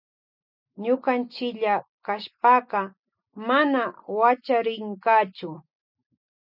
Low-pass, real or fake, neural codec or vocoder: 5.4 kHz; real; none